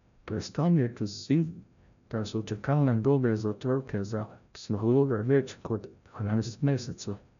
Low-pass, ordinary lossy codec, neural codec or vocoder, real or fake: 7.2 kHz; none; codec, 16 kHz, 0.5 kbps, FreqCodec, larger model; fake